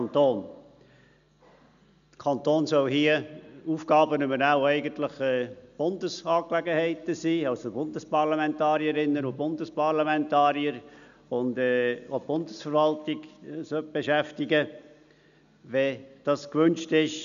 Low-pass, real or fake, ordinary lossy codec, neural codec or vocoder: 7.2 kHz; real; none; none